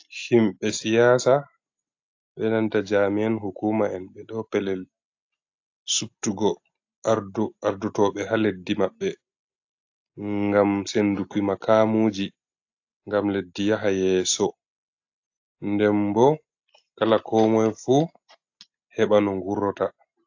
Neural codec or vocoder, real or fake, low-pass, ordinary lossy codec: none; real; 7.2 kHz; AAC, 48 kbps